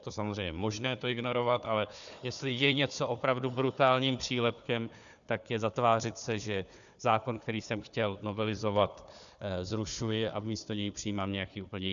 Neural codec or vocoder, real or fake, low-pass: codec, 16 kHz, 4 kbps, FunCodec, trained on Chinese and English, 50 frames a second; fake; 7.2 kHz